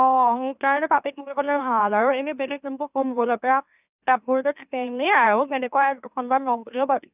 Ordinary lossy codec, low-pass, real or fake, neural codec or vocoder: none; 3.6 kHz; fake; autoencoder, 44.1 kHz, a latent of 192 numbers a frame, MeloTTS